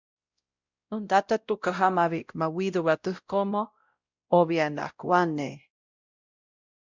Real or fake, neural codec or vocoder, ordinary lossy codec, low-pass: fake; codec, 16 kHz, 0.5 kbps, X-Codec, WavLM features, trained on Multilingual LibriSpeech; Opus, 64 kbps; 7.2 kHz